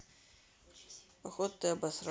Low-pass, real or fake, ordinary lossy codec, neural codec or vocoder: none; real; none; none